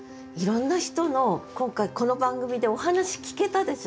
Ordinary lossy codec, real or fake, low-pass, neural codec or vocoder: none; real; none; none